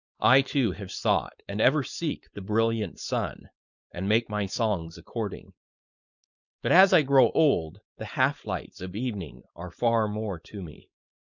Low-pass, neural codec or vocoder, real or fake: 7.2 kHz; codec, 16 kHz, 4.8 kbps, FACodec; fake